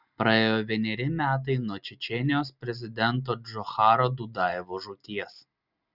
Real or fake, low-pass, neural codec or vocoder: real; 5.4 kHz; none